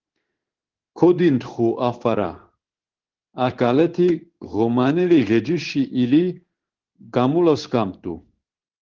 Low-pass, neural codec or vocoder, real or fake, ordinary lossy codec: 7.2 kHz; codec, 16 kHz in and 24 kHz out, 1 kbps, XY-Tokenizer; fake; Opus, 16 kbps